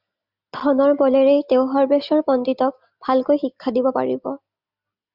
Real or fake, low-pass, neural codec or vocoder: real; 5.4 kHz; none